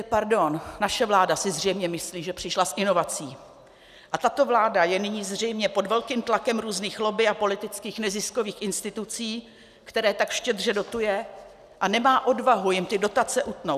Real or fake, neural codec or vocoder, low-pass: real; none; 14.4 kHz